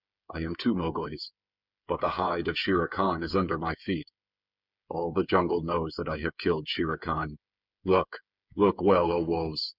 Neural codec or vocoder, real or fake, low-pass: codec, 16 kHz, 8 kbps, FreqCodec, smaller model; fake; 5.4 kHz